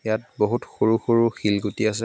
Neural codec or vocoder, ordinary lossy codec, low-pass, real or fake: none; none; none; real